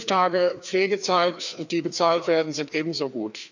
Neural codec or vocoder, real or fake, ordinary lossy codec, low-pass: codec, 16 kHz, 2 kbps, FreqCodec, larger model; fake; none; 7.2 kHz